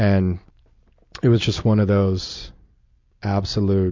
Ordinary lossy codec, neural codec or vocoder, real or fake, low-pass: MP3, 64 kbps; none; real; 7.2 kHz